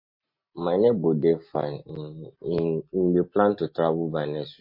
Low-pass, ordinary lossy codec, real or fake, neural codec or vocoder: 5.4 kHz; MP3, 32 kbps; real; none